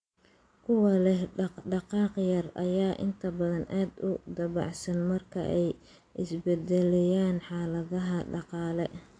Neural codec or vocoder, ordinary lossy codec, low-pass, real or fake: none; AAC, 48 kbps; 9.9 kHz; real